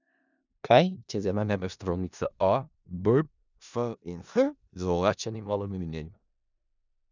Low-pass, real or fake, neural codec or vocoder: 7.2 kHz; fake; codec, 16 kHz in and 24 kHz out, 0.4 kbps, LongCat-Audio-Codec, four codebook decoder